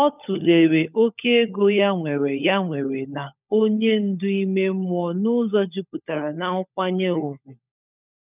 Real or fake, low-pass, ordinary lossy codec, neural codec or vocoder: fake; 3.6 kHz; none; codec, 16 kHz, 16 kbps, FunCodec, trained on LibriTTS, 50 frames a second